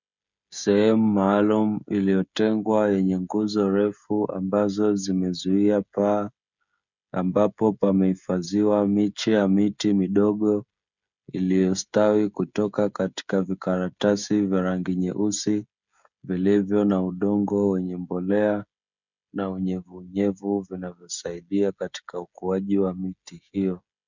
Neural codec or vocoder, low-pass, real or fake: codec, 16 kHz, 16 kbps, FreqCodec, smaller model; 7.2 kHz; fake